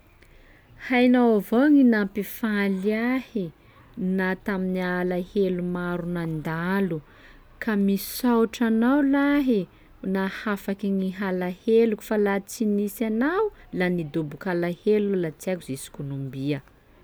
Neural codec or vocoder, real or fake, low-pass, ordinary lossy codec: none; real; none; none